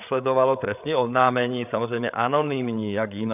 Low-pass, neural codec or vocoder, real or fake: 3.6 kHz; codec, 16 kHz, 8 kbps, FreqCodec, larger model; fake